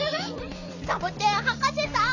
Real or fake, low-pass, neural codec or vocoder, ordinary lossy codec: fake; 7.2 kHz; vocoder, 44.1 kHz, 128 mel bands every 256 samples, BigVGAN v2; none